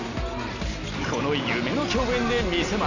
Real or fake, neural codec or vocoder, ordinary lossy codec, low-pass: real; none; none; 7.2 kHz